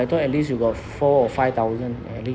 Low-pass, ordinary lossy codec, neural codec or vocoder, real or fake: none; none; none; real